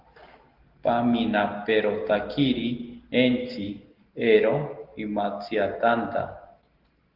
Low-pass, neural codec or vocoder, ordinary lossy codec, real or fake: 5.4 kHz; none; Opus, 16 kbps; real